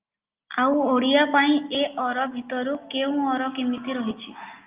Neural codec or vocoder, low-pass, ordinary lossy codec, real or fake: none; 3.6 kHz; Opus, 32 kbps; real